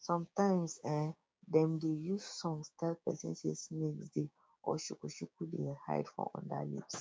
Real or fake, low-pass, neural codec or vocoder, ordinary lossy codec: fake; none; codec, 16 kHz, 6 kbps, DAC; none